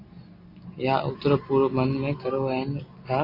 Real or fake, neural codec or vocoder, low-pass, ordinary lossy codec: real; none; 5.4 kHz; AAC, 32 kbps